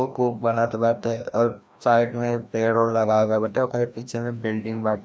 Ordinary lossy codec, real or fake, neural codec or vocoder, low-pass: none; fake; codec, 16 kHz, 1 kbps, FreqCodec, larger model; none